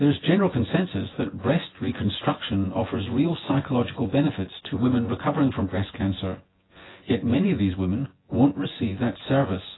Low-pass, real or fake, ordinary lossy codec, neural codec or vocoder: 7.2 kHz; fake; AAC, 16 kbps; vocoder, 24 kHz, 100 mel bands, Vocos